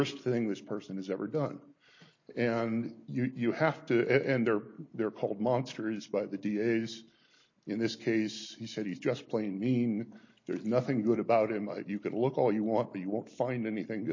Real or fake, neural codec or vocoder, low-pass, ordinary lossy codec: real; none; 7.2 kHz; MP3, 32 kbps